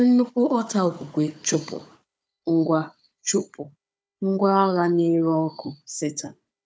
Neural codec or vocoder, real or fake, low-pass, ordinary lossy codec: codec, 16 kHz, 4 kbps, FunCodec, trained on Chinese and English, 50 frames a second; fake; none; none